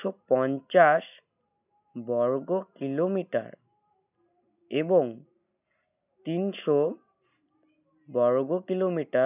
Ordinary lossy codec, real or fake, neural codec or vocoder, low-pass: none; real; none; 3.6 kHz